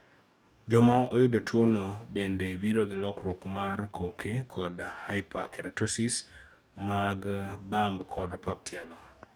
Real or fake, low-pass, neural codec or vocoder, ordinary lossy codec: fake; none; codec, 44.1 kHz, 2.6 kbps, DAC; none